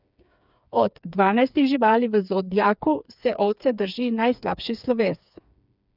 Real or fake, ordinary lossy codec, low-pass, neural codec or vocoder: fake; none; 5.4 kHz; codec, 16 kHz, 4 kbps, FreqCodec, smaller model